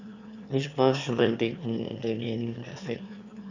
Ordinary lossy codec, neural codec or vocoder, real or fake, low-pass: none; autoencoder, 22.05 kHz, a latent of 192 numbers a frame, VITS, trained on one speaker; fake; 7.2 kHz